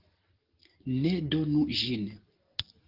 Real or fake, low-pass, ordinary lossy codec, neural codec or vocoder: real; 5.4 kHz; Opus, 16 kbps; none